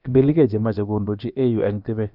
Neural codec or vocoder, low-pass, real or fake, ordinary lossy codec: codec, 16 kHz, about 1 kbps, DyCAST, with the encoder's durations; 5.4 kHz; fake; none